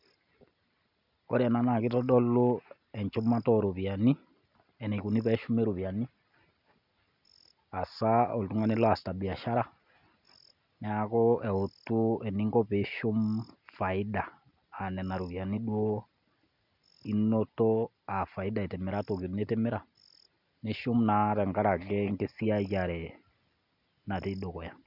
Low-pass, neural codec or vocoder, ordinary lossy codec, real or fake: 5.4 kHz; none; none; real